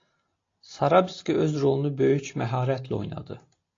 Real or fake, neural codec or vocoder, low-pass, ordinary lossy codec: real; none; 7.2 kHz; AAC, 32 kbps